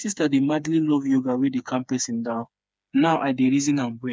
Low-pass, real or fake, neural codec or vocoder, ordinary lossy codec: none; fake; codec, 16 kHz, 4 kbps, FreqCodec, smaller model; none